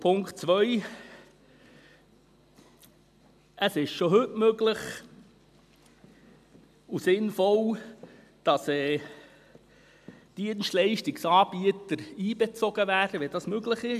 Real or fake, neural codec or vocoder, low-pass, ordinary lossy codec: real; none; 14.4 kHz; AAC, 96 kbps